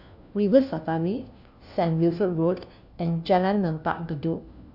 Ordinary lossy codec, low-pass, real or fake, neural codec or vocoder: none; 5.4 kHz; fake; codec, 16 kHz, 1 kbps, FunCodec, trained on LibriTTS, 50 frames a second